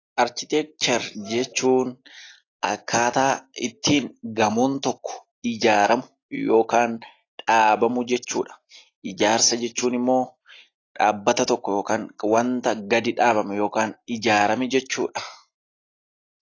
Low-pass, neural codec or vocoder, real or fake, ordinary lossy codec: 7.2 kHz; none; real; AAC, 32 kbps